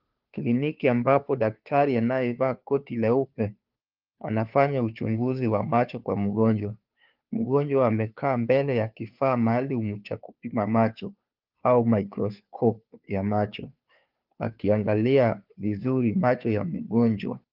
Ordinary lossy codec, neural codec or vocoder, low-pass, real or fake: Opus, 32 kbps; codec, 16 kHz, 2 kbps, FunCodec, trained on Chinese and English, 25 frames a second; 5.4 kHz; fake